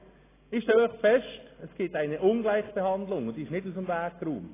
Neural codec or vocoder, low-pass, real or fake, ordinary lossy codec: none; 3.6 kHz; real; AAC, 16 kbps